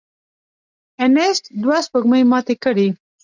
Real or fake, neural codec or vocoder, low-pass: real; none; 7.2 kHz